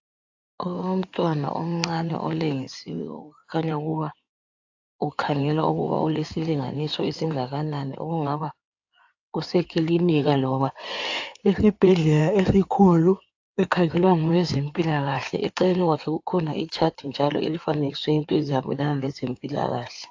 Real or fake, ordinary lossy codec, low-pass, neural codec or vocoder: fake; AAC, 48 kbps; 7.2 kHz; codec, 16 kHz in and 24 kHz out, 2.2 kbps, FireRedTTS-2 codec